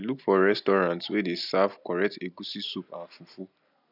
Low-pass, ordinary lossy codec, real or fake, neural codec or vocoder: 5.4 kHz; none; real; none